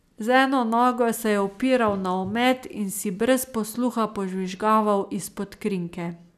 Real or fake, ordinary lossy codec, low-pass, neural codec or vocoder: real; none; 14.4 kHz; none